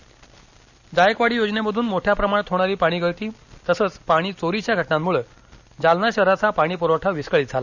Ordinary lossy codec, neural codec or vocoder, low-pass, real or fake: none; none; 7.2 kHz; real